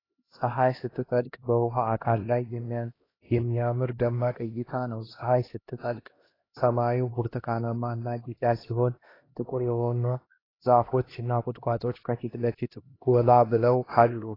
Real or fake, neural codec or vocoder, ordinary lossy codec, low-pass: fake; codec, 16 kHz, 1 kbps, X-Codec, HuBERT features, trained on LibriSpeech; AAC, 24 kbps; 5.4 kHz